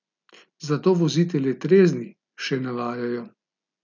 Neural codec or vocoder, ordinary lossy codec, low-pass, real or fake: none; none; 7.2 kHz; real